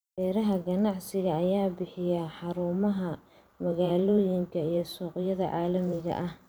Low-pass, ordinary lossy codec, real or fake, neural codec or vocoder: none; none; fake; vocoder, 44.1 kHz, 128 mel bands every 512 samples, BigVGAN v2